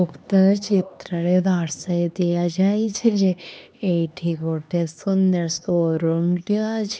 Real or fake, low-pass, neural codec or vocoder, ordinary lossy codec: fake; none; codec, 16 kHz, 2 kbps, X-Codec, HuBERT features, trained on LibriSpeech; none